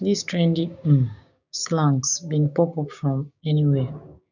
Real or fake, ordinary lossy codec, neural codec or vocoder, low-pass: fake; none; codec, 16 kHz, 6 kbps, DAC; 7.2 kHz